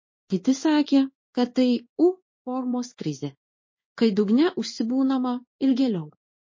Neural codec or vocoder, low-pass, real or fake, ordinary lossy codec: codec, 16 kHz in and 24 kHz out, 1 kbps, XY-Tokenizer; 7.2 kHz; fake; MP3, 32 kbps